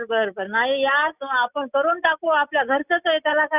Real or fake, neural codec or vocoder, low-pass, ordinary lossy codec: real; none; 3.6 kHz; none